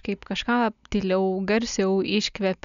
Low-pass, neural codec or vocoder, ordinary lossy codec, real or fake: 7.2 kHz; none; MP3, 96 kbps; real